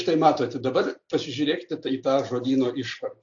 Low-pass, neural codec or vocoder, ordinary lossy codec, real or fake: 7.2 kHz; none; AAC, 48 kbps; real